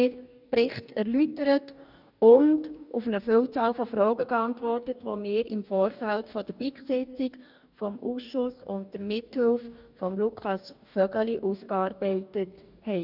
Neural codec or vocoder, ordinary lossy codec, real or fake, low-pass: codec, 44.1 kHz, 2.6 kbps, DAC; none; fake; 5.4 kHz